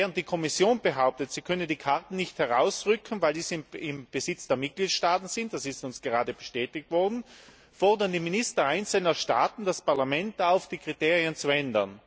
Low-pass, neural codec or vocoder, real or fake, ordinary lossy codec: none; none; real; none